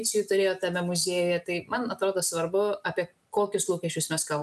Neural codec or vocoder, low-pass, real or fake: none; 14.4 kHz; real